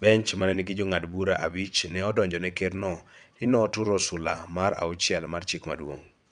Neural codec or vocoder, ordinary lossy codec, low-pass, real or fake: vocoder, 22.05 kHz, 80 mel bands, WaveNeXt; none; 9.9 kHz; fake